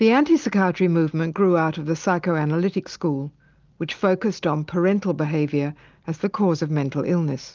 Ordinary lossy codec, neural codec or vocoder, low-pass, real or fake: Opus, 24 kbps; none; 7.2 kHz; real